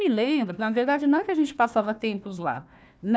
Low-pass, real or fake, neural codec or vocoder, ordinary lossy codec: none; fake; codec, 16 kHz, 1 kbps, FunCodec, trained on Chinese and English, 50 frames a second; none